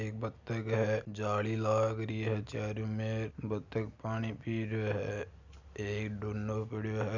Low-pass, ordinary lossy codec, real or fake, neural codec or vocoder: 7.2 kHz; none; real; none